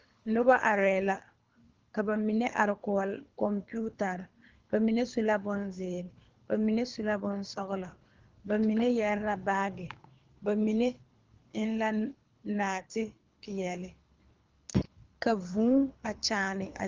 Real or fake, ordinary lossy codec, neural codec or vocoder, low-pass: fake; Opus, 24 kbps; codec, 24 kHz, 3 kbps, HILCodec; 7.2 kHz